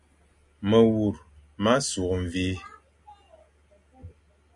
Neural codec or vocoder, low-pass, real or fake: none; 10.8 kHz; real